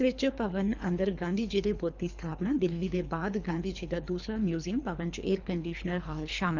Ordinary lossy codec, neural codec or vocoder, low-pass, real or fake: none; codec, 24 kHz, 3 kbps, HILCodec; 7.2 kHz; fake